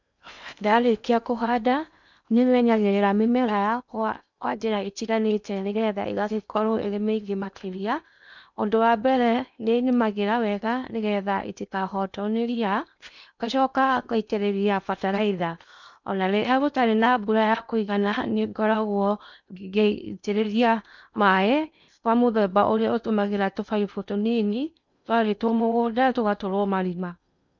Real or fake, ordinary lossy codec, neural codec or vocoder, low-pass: fake; none; codec, 16 kHz in and 24 kHz out, 0.8 kbps, FocalCodec, streaming, 65536 codes; 7.2 kHz